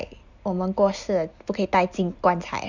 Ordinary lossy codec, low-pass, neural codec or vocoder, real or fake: none; 7.2 kHz; none; real